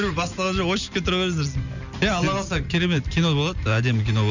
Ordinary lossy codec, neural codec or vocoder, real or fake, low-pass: none; none; real; 7.2 kHz